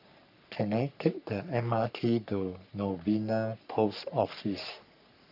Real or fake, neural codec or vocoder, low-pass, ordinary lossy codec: fake; codec, 44.1 kHz, 3.4 kbps, Pupu-Codec; 5.4 kHz; none